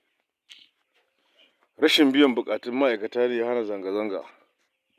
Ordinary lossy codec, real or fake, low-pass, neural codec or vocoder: none; real; 14.4 kHz; none